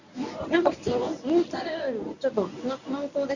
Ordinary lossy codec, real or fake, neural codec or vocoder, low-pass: none; fake; codec, 24 kHz, 0.9 kbps, WavTokenizer, medium speech release version 1; 7.2 kHz